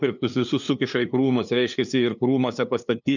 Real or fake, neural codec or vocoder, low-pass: fake; codec, 16 kHz, 2 kbps, FunCodec, trained on LibriTTS, 25 frames a second; 7.2 kHz